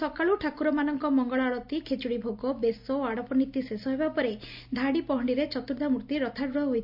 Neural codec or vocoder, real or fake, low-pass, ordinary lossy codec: none; real; 5.4 kHz; MP3, 48 kbps